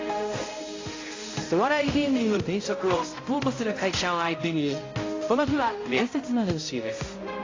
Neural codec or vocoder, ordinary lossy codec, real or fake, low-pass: codec, 16 kHz, 0.5 kbps, X-Codec, HuBERT features, trained on balanced general audio; AAC, 32 kbps; fake; 7.2 kHz